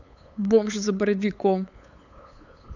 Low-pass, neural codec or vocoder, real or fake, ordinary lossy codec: 7.2 kHz; codec, 16 kHz, 8 kbps, FunCodec, trained on LibriTTS, 25 frames a second; fake; none